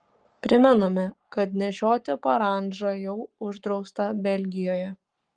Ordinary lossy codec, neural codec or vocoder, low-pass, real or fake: Opus, 24 kbps; codec, 44.1 kHz, 7.8 kbps, Pupu-Codec; 9.9 kHz; fake